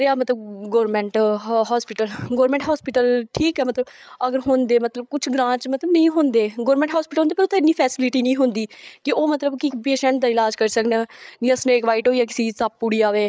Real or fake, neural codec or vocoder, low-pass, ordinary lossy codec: fake; codec, 16 kHz, 8 kbps, FreqCodec, larger model; none; none